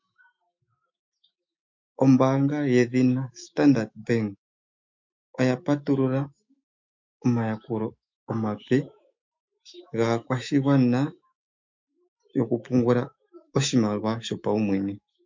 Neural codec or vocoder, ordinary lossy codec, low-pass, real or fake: autoencoder, 48 kHz, 128 numbers a frame, DAC-VAE, trained on Japanese speech; MP3, 48 kbps; 7.2 kHz; fake